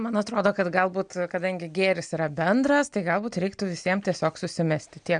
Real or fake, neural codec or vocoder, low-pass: real; none; 9.9 kHz